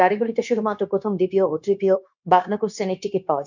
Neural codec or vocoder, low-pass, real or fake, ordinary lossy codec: codec, 16 kHz, 0.9 kbps, LongCat-Audio-Codec; 7.2 kHz; fake; none